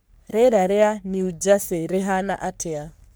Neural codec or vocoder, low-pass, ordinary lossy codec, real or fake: codec, 44.1 kHz, 3.4 kbps, Pupu-Codec; none; none; fake